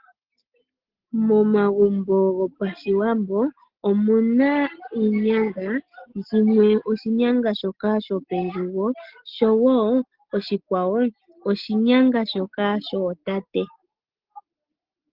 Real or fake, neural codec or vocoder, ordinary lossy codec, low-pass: real; none; Opus, 16 kbps; 5.4 kHz